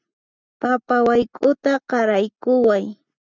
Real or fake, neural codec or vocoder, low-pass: real; none; 7.2 kHz